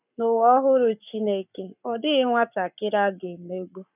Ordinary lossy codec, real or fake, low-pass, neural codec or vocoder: none; fake; 3.6 kHz; codec, 44.1 kHz, 7.8 kbps, Pupu-Codec